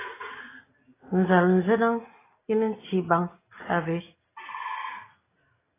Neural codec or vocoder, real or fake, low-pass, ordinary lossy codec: none; real; 3.6 kHz; AAC, 16 kbps